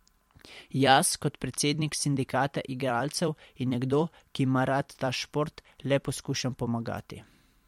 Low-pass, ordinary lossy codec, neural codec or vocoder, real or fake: 19.8 kHz; MP3, 64 kbps; vocoder, 44.1 kHz, 128 mel bands every 256 samples, BigVGAN v2; fake